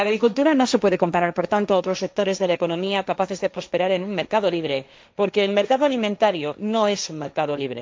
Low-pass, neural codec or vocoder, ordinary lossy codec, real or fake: none; codec, 16 kHz, 1.1 kbps, Voila-Tokenizer; none; fake